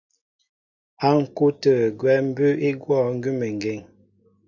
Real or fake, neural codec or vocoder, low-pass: real; none; 7.2 kHz